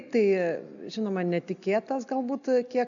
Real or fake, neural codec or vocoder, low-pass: real; none; 7.2 kHz